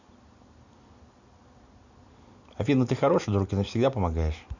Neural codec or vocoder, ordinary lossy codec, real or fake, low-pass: none; none; real; 7.2 kHz